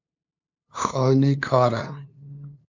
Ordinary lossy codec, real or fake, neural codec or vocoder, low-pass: MP3, 64 kbps; fake; codec, 16 kHz, 2 kbps, FunCodec, trained on LibriTTS, 25 frames a second; 7.2 kHz